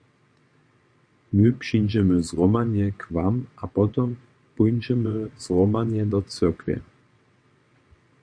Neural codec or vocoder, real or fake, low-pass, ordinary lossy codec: vocoder, 22.05 kHz, 80 mel bands, WaveNeXt; fake; 9.9 kHz; MP3, 48 kbps